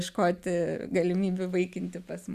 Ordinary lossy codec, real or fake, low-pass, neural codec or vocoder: AAC, 96 kbps; real; 14.4 kHz; none